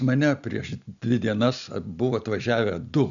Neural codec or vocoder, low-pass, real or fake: none; 7.2 kHz; real